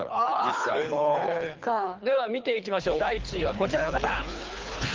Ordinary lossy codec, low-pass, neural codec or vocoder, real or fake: Opus, 32 kbps; 7.2 kHz; codec, 24 kHz, 3 kbps, HILCodec; fake